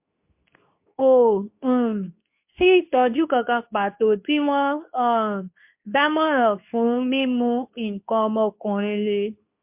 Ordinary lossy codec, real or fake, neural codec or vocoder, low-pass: MP3, 32 kbps; fake; codec, 24 kHz, 0.9 kbps, WavTokenizer, medium speech release version 2; 3.6 kHz